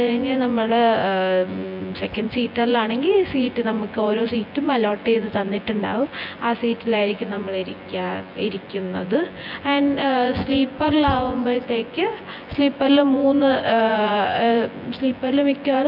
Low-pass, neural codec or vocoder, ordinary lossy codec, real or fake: 5.4 kHz; vocoder, 24 kHz, 100 mel bands, Vocos; MP3, 48 kbps; fake